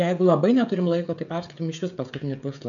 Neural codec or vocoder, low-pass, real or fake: codec, 16 kHz, 16 kbps, FreqCodec, smaller model; 7.2 kHz; fake